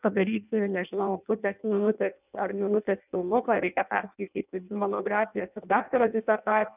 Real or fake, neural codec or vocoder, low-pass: fake; codec, 16 kHz in and 24 kHz out, 0.6 kbps, FireRedTTS-2 codec; 3.6 kHz